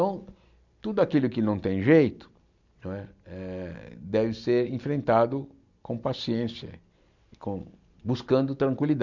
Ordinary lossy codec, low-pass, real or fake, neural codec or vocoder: none; 7.2 kHz; real; none